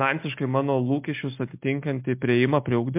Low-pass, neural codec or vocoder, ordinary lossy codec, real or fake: 3.6 kHz; none; MP3, 32 kbps; real